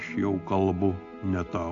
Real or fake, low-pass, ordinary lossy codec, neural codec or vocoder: real; 7.2 kHz; AAC, 48 kbps; none